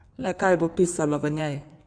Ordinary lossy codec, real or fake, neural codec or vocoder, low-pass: none; fake; codec, 16 kHz in and 24 kHz out, 1.1 kbps, FireRedTTS-2 codec; 9.9 kHz